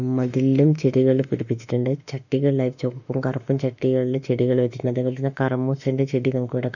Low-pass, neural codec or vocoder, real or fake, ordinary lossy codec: 7.2 kHz; autoencoder, 48 kHz, 32 numbers a frame, DAC-VAE, trained on Japanese speech; fake; none